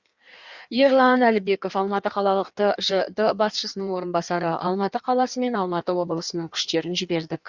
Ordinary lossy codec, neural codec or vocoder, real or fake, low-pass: Opus, 64 kbps; codec, 16 kHz in and 24 kHz out, 1.1 kbps, FireRedTTS-2 codec; fake; 7.2 kHz